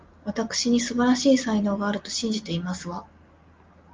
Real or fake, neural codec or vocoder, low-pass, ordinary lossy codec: real; none; 7.2 kHz; Opus, 32 kbps